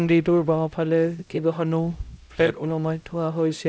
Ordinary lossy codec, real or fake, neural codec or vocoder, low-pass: none; fake; codec, 16 kHz, 0.5 kbps, X-Codec, HuBERT features, trained on LibriSpeech; none